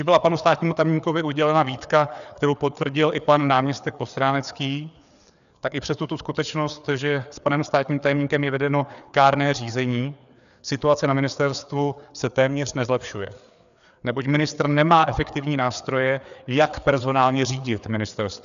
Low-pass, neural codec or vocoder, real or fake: 7.2 kHz; codec, 16 kHz, 4 kbps, FreqCodec, larger model; fake